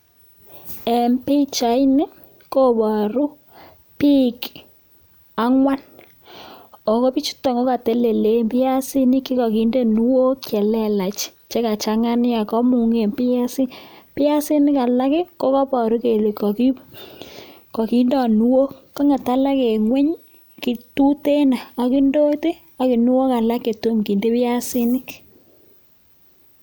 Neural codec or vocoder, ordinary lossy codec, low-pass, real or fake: none; none; none; real